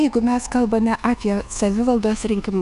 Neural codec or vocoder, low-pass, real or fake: codec, 24 kHz, 1.2 kbps, DualCodec; 10.8 kHz; fake